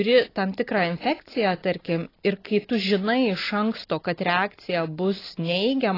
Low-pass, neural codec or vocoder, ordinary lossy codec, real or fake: 5.4 kHz; none; AAC, 24 kbps; real